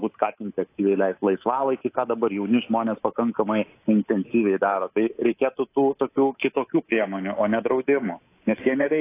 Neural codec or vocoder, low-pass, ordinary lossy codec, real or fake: codec, 24 kHz, 3.1 kbps, DualCodec; 3.6 kHz; AAC, 24 kbps; fake